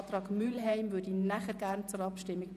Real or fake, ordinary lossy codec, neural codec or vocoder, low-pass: fake; none; vocoder, 48 kHz, 128 mel bands, Vocos; 14.4 kHz